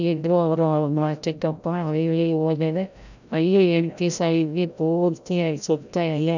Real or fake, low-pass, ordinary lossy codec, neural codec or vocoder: fake; 7.2 kHz; none; codec, 16 kHz, 0.5 kbps, FreqCodec, larger model